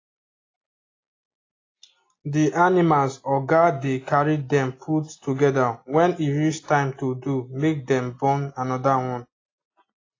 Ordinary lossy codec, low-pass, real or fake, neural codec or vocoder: AAC, 32 kbps; 7.2 kHz; real; none